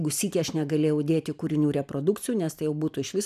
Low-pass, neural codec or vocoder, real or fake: 14.4 kHz; none; real